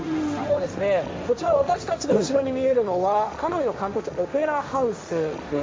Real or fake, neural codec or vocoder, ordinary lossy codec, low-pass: fake; codec, 16 kHz, 1.1 kbps, Voila-Tokenizer; none; none